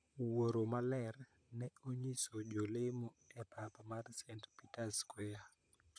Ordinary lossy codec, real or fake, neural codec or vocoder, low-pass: none; fake; vocoder, 44.1 kHz, 128 mel bands, Pupu-Vocoder; 9.9 kHz